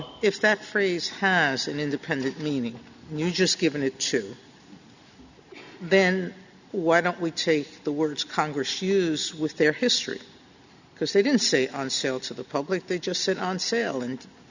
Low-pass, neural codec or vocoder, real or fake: 7.2 kHz; none; real